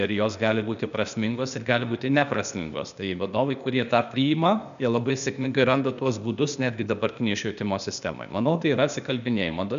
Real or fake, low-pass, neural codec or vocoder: fake; 7.2 kHz; codec, 16 kHz, 0.8 kbps, ZipCodec